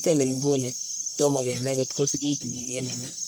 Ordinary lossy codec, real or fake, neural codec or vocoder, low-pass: none; fake; codec, 44.1 kHz, 1.7 kbps, Pupu-Codec; none